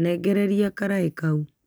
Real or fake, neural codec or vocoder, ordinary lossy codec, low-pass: real; none; none; none